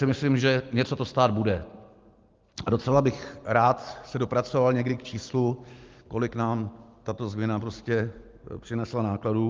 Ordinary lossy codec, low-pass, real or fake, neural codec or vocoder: Opus, 24 kbps; 7.2 kHz; real; none